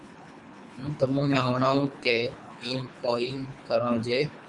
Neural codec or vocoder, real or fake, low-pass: codec, 24 kHz, 3 kbps, HILCodec; fake; 10.8 kHz